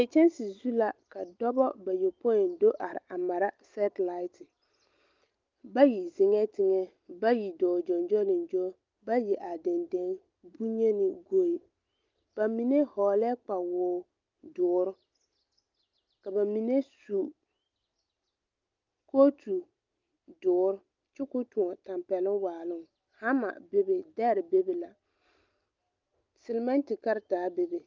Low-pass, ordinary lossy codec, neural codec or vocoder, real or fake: 7.2 kHz; Opus, 24 kbps; none; real